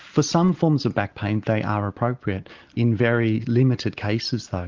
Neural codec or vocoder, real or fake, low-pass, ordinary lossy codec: none; real; 7.2 kHz; Opus, 32 kbps